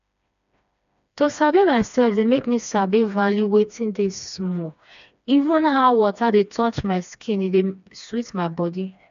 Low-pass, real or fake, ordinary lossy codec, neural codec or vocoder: 7.2 kHz; fake; AAC, 96 kbps; codec, 16 kHz, 2 kbps, FreqCodec, smaller model